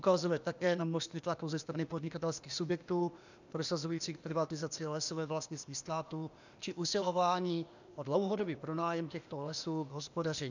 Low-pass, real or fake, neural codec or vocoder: 7.2 kHz; fake; codec, 16 kHz, 0.8 kbps, ZipCodec